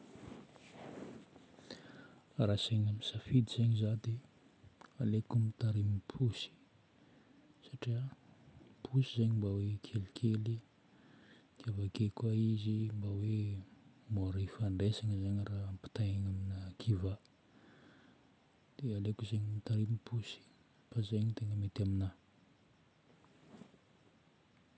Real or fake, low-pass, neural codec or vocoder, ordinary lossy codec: real; none; none; none